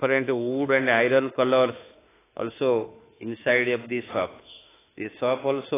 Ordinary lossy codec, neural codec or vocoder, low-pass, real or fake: AAC, 16 kbps; autoencoder, 48 kHz, 32 numbers a frame, DAC-VAE, trained on Japanese speech; 3.6 kHz; fake